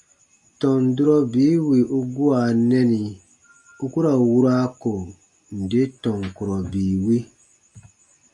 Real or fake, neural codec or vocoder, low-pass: real; none; 10.8 kHz